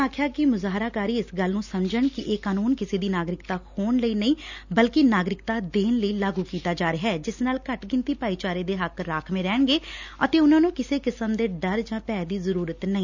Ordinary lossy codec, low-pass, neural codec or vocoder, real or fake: none; 7.2 kHz; none; real